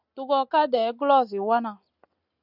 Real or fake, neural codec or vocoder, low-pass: real; none; 5.4 kHz